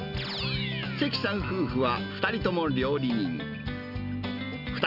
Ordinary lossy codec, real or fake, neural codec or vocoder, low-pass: none; real; none; 5.4 kHz